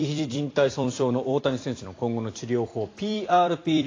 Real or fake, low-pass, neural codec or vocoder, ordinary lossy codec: fake; 7.2 kHz; vocoder, 44.1 kHz, 128 mel bands every 256 samples, BigVGAN v2; AAC, 32 kbps